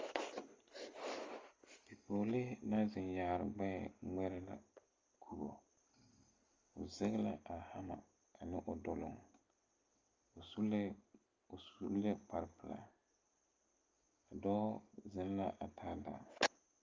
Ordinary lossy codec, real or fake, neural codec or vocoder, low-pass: Opus, 32 kbps; real; none; 7.2 kHz